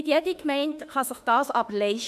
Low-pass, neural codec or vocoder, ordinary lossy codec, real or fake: 14.4 kHz; autoencoder, 48 kHz, 32 numbers a frame, DAC-VAE, trained on Japanese speech; none; fake